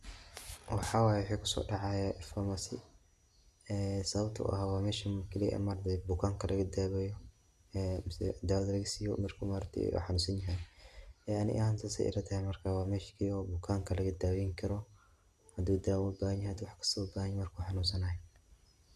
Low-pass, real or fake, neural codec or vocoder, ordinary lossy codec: 14.4 kHz; real; none; Opus, 64 kbps